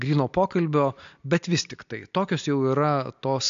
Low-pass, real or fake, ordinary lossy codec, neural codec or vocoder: 7.2 kHz; real; AAC, 64 kbps; none